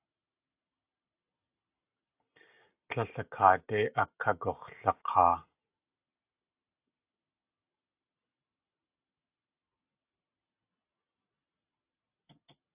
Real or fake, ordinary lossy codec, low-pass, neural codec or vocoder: real; AAC, 32 kbps; 3.6 kHz; none